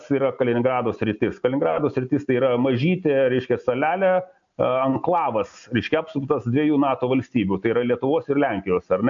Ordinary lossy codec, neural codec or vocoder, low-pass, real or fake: AAC, 64 kbps; none; 7.2 kHz; real